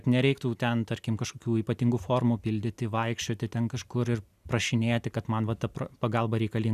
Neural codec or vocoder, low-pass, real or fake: none; 14.4 kHz; real